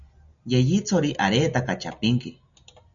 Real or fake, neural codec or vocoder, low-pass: real; none; 7.2 kHz